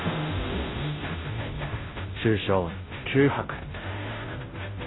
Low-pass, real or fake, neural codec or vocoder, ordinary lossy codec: 7.2 kHz; fake; codec, 16 kHz, 0.5 kbps, FunCodec, trained on Chinese and English, 25 frames a second; AAC, 16 kbps